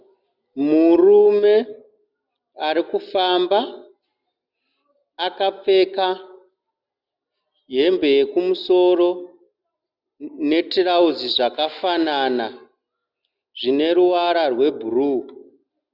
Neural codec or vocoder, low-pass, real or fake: none; 5.4 kHz; real